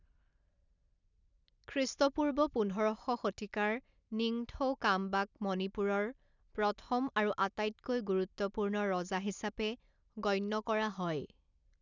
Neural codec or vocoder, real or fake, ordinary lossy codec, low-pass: none; real; none; 7.2 kHz